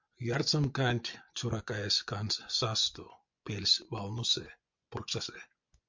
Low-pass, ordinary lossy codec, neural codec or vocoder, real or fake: 7.2 kHz; MP3, 64 kbps; vocoder, 24 kHz, 100 mel bands, Vocos; fake